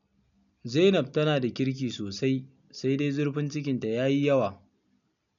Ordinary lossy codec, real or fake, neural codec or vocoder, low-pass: none; real; none; 7.2 kHz